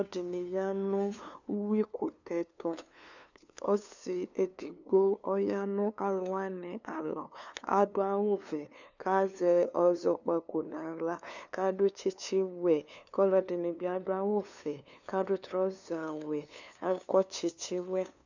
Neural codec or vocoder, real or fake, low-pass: codec, 16 kHz, 2 kbps, FunCodec, trained on LibriTTS, 25 frames a second; fake; 7.2 kHz